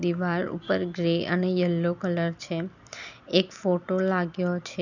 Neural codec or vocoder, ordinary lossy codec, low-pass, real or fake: none; none; 7.2 kHz; real